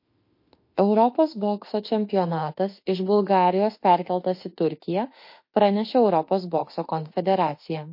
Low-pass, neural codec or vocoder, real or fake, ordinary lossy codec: 5.4 kHz; autoencoder, 48 kHz, 32 numbers a frame, DAC-VAE, trained on Japanese speech; fake; MP3, 32 kbps